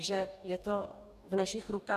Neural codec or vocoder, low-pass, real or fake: codec, 44.1 kHz, 2.6 kbps, DAC; 14.4 kHz; fake